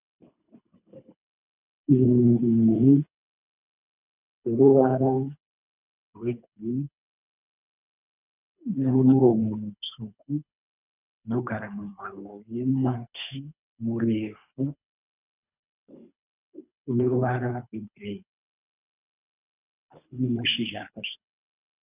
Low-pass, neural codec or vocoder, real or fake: 3.6 kHz; codec, 24 kHz, 3 kbps, HILCodec; fake